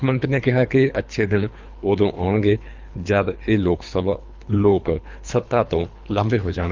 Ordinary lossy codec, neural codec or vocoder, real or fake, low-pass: Opus, 24 kbps; codec, 24 kHz, 3 kbps, HILCodec; fake; 7.2 kHz